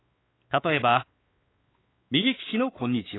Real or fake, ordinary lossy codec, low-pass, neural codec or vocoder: fake; AAC, 16 kbps; 7.2 kHz; codec, 16 kHz, 4 kbps, X-Codec, WavLM features, trained on Multilingual LibriSpeech